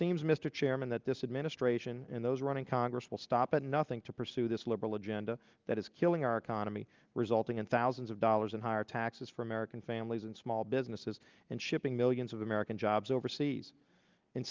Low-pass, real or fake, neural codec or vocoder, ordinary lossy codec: 7.2 kHz; real; none; Opus, 32 kbps